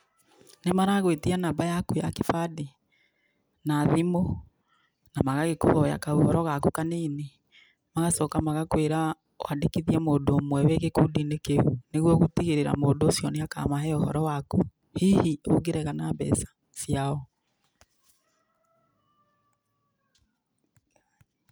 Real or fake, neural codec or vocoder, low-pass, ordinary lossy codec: real; none; none; none